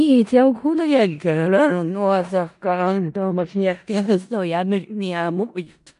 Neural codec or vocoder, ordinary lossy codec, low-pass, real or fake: codec, 16 kHz in and 24 kHz out, 0.4 kbps, LongCat-Audio-Codec, four codebook decoder; none; 10.8 kHz; fake